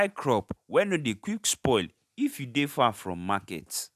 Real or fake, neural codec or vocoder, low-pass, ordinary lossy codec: real; none; 14.4 kHz; none